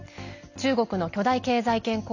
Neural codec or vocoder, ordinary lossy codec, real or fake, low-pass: none; none; real; 7.2 kHz